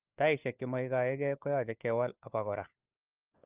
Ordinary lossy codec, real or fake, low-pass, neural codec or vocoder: Opus, 32 kbps; fake; 3.6 kHz; codec, 16 kHz, 4 kbps, FunCodec, trained on LibriTTS, 50 frames a second